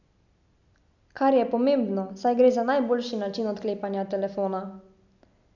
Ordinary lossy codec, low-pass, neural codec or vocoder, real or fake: none; 7.2 kHz; none; real